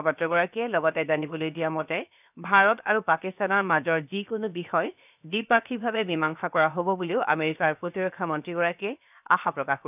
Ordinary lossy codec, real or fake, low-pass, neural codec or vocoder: none; fake; 3.6 kHz; codec, 16 kHz, 0.7 kbps, FocalCodec